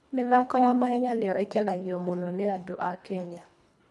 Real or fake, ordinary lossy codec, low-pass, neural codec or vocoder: fake; none; none; codec, 24 kHz, 1.5 kbps, HILCodec